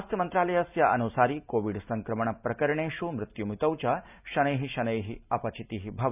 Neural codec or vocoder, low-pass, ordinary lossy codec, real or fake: none; 3.6 kHz; none; real